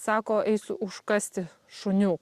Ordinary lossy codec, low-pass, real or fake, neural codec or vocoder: Opus, 64 kbps; 14.4 kHz; real; none